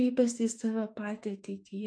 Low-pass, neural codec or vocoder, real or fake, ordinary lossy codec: 9.9 kHz; autoencoder, 48 kHz, 32 numbers a frame, DAC-VAE, trained on Japanese speech; fake; AAC, 48 kbps